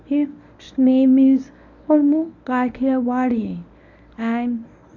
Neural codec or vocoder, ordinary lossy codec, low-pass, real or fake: codec, 24 kHz, 0.9 kbps, WavTokenizer, medium speech release version 1; none; 7.2 kHz; fake